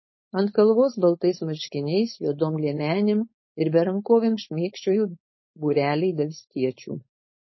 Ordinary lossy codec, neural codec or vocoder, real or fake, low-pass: MP3, 24 kbps; codec, 16 kHz, 4.8 kbps, FACodec; fake; 7.2 kHz